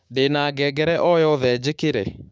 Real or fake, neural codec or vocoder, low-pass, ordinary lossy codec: fake; codec, 16 kHz, 6 kbps, DAC; none; none